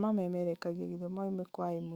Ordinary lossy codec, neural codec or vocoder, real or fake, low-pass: Opus, 64 kbps; autoencoder, 48 kHz, 128 numbers a frame, DAC-VAE, trained on Japanese speech; fake; 19.8 kHz